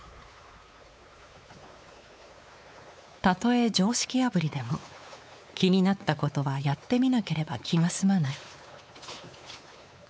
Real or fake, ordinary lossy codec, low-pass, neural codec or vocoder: fake; none; none; codec, 16 kHz, 4 kbps, X-Codec, WavLM features, trained on Multilingual LibriSpeech